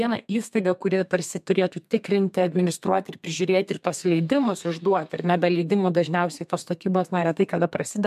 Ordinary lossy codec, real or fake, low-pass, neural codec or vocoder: MP3, 96 kbps; fake; 14.4 kHz; codec, 32 kHz, 1.9 kbps, SNAC